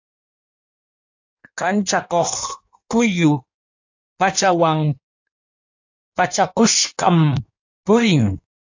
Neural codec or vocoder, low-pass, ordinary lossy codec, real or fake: codec, 16 kHz in and 24 kHz out, 1.1 kbps, FireRedTTS-2 codec; 7.2 kHz; AAC, 48 kbps; fake